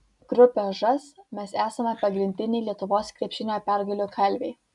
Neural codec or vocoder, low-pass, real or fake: none; 10.8 kHz; real